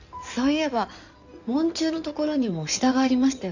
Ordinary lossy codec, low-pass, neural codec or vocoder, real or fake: none; 7.2 kHz; codec, 16 kHz in and 24 kHz out, 2.2 kbps, FireRedTTS-2 codec; fake